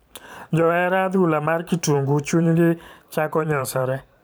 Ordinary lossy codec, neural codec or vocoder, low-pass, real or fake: none; vocoder, 44.1 kHz, 128 mel bands every 512 samples, BigVGAN v2; none; fake